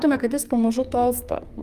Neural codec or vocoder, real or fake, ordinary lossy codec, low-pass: codec, 44.1 kHz, 2.6 kbps, SNAC; fake; Opus, 32 kbps; 14.4 kHz